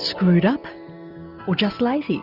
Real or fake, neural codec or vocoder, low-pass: real; none; 5.4 kHz